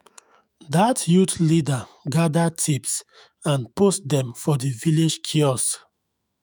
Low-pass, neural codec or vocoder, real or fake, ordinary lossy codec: none; autoencoder, 48 kHz, 128 numbers a frame, DAC-VAE, trained on Japanese speech; fake; none